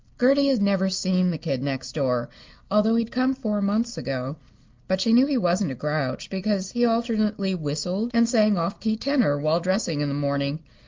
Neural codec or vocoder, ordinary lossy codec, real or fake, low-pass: none; Opus, 32 kbps; real; 7.2 kHz